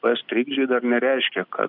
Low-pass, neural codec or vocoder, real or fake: 5.4 kHz; none; real